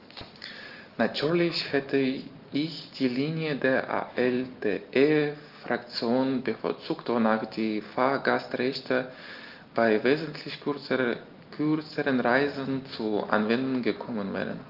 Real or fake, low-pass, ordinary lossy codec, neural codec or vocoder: real; 5.4 kHz; Opus, 24 kbps; none